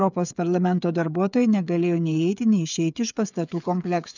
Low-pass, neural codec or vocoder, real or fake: 7.2 kHz; codec, 16 kHz, 8 kbps, FreqCodec, smaller model; fake